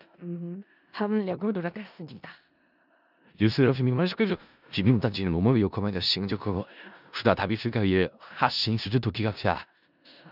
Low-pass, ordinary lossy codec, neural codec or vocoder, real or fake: 5.4 kHz; none; codec, 16 kHz in and 24 kHz out, 0.4 kbps, LongCat-Audio-Codec, four codebook decoder; fake